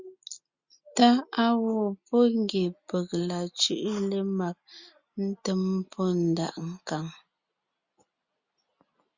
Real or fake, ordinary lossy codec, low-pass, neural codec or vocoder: real; Opus, 64 kbps; 7.2 kHz; none